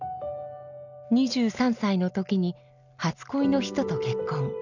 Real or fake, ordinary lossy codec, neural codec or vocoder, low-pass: real; none; none; 7.2 kHz